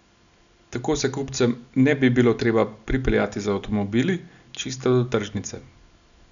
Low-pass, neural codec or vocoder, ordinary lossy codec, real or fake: 7.2 kHz; none; none; real